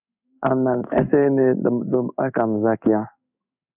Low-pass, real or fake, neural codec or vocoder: 3.6 kHz; fake; codec, 16 kHz in and 24 kHz out, 1 kbps, XY-Tokenizer